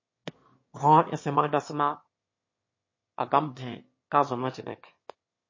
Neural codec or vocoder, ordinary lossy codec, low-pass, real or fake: autoencoder, 22.05 kHz, a latent of 192 numbers a frame, VITS, trained on one speaker; MP3, 32 kbps; 7.2 kHz; fake